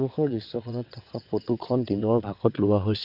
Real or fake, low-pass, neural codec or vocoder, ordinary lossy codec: fake; 5.4 kHz; vocoder, 22.05 kHz, 80 mel bands, WaveNeXt; none